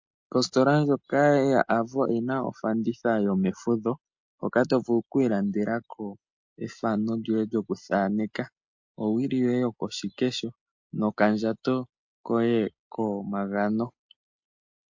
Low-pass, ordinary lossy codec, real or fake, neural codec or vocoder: 7.2 kHz; MP3, 48 kbps; real; none